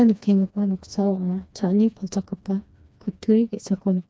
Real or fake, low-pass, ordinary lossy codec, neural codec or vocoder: fake; none; none; codec, 16 kHz, 2 kbps, FreqCodec, smaller model